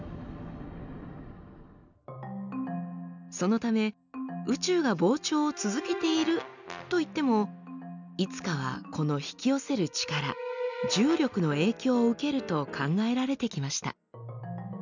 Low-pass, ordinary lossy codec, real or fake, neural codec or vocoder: 7.2 kHz; none; real; none